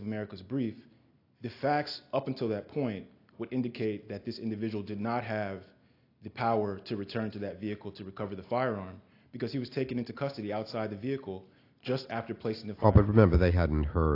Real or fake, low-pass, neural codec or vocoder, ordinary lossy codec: real; 5.4 kHz; none; AAC, 32 kbps